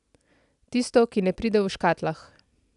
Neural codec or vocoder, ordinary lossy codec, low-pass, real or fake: none; none; 10.8 kHz; real